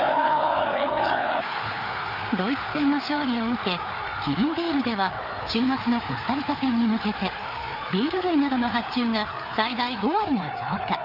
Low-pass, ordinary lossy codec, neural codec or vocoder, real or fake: 5.4 kHz; none; codec, 16 kHz, 4 kbps, FreqCodec, larger model; fake